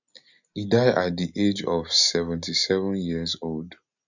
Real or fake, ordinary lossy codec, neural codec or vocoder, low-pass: real; none; none; 7.2 kHz